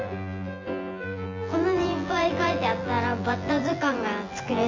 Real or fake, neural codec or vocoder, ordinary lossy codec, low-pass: fake; vocoder, 24 kHz, 100 mel bands, Vocos; MP3, 48 kbps; 7.2 kHz